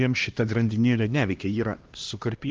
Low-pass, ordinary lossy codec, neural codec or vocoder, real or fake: 7.2 kHz; Opus, 32 kbps; codec, 16 kHz, 1 kbps, X-Codec, HuBERT features, trained on LibriSpeech; fake